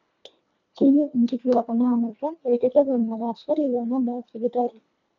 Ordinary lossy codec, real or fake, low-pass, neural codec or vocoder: none; fake; 7.2 kHz; codec, 24 kHz, 1.5 kbps, HILCodec